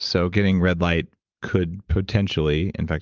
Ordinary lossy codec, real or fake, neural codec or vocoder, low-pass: Opus, 32 kbps; fake; vocoder, 44.1 kHz, 128 mel bands every 512 samples, BigVGAN v2; 7.2 kHz